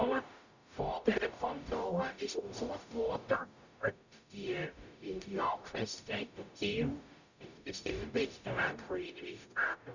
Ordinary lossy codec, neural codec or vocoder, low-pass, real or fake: none; codec, 44.1 kHz, 0.9 kbps, DAC; 7.2 kHz; fake